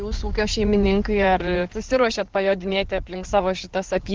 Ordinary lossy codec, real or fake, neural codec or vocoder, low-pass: Opus, 16 kbps; fake; codec, 16 kHz in and 24 kHz out, 2.2 kbps, FireRedTTS-2 codec; 7.2 kHz